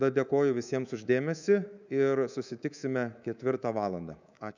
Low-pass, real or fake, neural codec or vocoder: 7.2 kHz; fake; codec, 24 kHz, 3.1 kbps, DualCodec